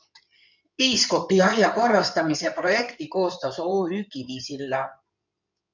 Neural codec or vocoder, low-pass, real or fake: codec, 16 kHz in and 24 kHz out, 2.2 kbps, FireRedTTS-2 codec; 7.2 kHz; fake